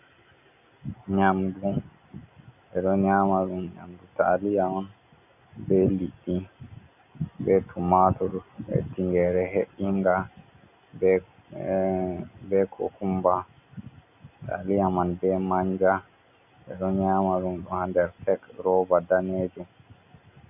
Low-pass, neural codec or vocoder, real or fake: 3.6 kHz; none; real